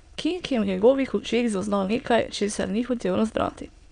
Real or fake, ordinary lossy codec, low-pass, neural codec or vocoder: fake; none; 9.9 kHz; autoencoder, 22.05 kHz, a latent of 192 numbers a frame, VITS, trained on many speakers